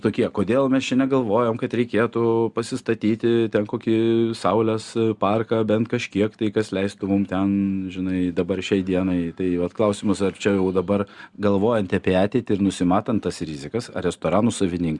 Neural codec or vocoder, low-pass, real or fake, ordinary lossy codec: none; 10.8 kHz; real; Opus, 64 kbps